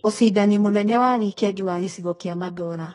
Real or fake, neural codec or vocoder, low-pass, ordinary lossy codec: fake; codec, 24 kHz, 0.9 kbps, WavTokenizer, medium music audio release; 10.8 kHz; AAC, 32 kbps